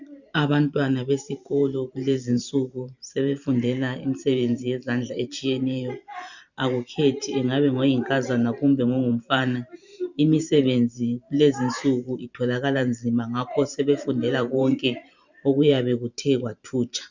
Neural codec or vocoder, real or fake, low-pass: vocoder, 44.1 kHz, 128 mel bands every 512 samples, BigVGAN v2; fake; 7.2 kHz